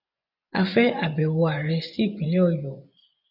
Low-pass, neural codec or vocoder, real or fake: 5.4 kHz; vocoder, 24 kHz, 100 mel bands, Vocos; fake